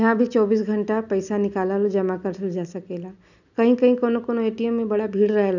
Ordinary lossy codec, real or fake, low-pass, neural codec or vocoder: none; real; 7.2 kHz; none